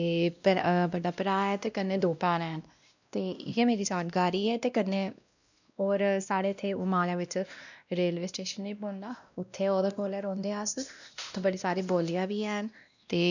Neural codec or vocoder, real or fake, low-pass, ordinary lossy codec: codec, 16 kHz, 1 kbps, X-Codec, WavLM features, trained on Multilingual LibriSpeech; fake; 7.2 kHz; none